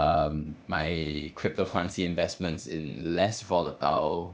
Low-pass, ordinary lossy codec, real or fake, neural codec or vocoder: none; none; fake; codec, 16 kHz, 0.8 kbps, ZipCodec